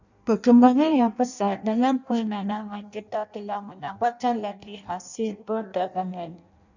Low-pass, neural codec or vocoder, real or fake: 7.2 kHz; codec, 16 kHz in and 24 kHz out, 0.6 kbps, FireRedTTS-2 codec; fake